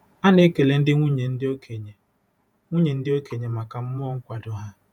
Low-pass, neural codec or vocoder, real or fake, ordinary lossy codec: 19.8 kHz; vocoder, 48 kHz, 128 mel bands, Vocos; fake; none